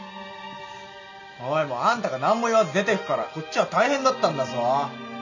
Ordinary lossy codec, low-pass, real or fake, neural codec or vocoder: none; 7.2 kHz; real; none